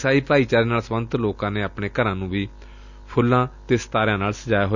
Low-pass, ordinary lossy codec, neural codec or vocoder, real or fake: 7.2 kHz; none; none; real